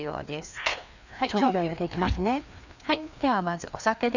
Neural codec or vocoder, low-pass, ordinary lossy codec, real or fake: codec, 16 kHz, 2 kbps, FreqCodec, larger model; 7.2 kHz; none; fake